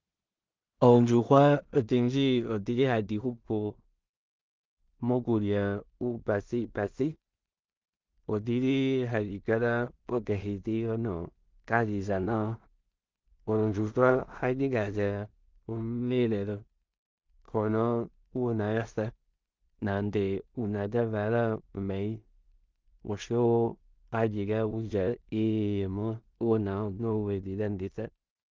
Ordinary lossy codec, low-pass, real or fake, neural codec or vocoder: Opus, 32 kbps; 7.2 kHz; fake; codec, 16 kHz in and 24 kHz out, 0.4 kbps, LongCat-Audio-Codec, two codebook decoder